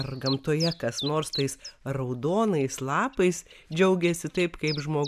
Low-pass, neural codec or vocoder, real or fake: 14.4 kHz; none; real